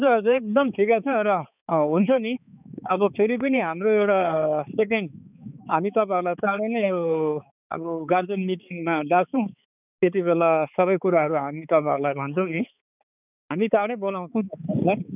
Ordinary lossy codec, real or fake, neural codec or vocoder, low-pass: none; fake; codec, 16 kHz, 4 kbps, X-Codec, HuBERT features, trained on balanced general audio; 3.6 kHz